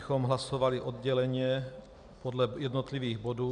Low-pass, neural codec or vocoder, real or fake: 9.9 kHz; none; real